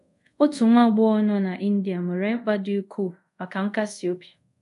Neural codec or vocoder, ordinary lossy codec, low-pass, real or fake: codec, 24 kHz, 0.5 kbps, DualCodec; none; 10.8 kHz; fake